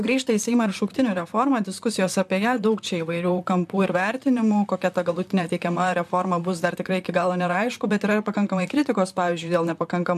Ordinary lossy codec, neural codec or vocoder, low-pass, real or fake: MP3, 96 kbps; vocoder, 44.1 kHz, 128 mel bands, Pupu-Vocoder; 14.4 kHz; fake